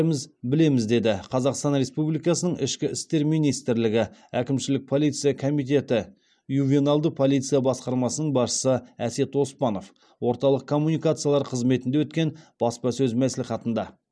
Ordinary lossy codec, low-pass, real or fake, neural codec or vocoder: none; none; real; none